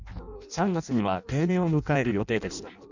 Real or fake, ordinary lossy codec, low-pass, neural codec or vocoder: fake; none; 7.2 kHz; codec, 16 kHz in and 24 kHz out, 0.6 kbps, FireRedTTS-2 codec